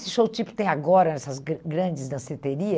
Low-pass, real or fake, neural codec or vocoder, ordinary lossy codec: none; real; none; none